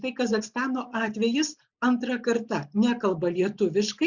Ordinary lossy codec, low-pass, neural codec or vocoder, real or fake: Opus, 64 kbps; 7.2 kHz; none; real